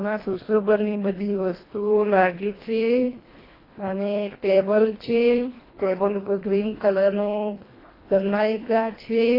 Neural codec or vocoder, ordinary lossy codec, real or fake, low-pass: codec, 24 kHz, 1.5 kbps, HILCodec; AAC, 24 kbps; fake; 5.4 kHz